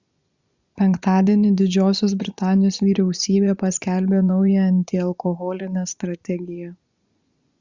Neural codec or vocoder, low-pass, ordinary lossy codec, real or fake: vocoder, 24 kHz, 100 mel bands, Vocos; 7.2 kHz; Opus, 64 kbps; fake